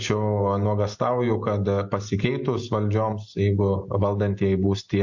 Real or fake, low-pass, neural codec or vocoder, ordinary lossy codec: real; 7.2 kHz; none; MP3, 48 kbps